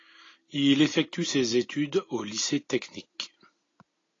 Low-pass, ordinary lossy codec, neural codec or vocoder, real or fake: 7.2 kHz; AAC, 32 kbps; none; real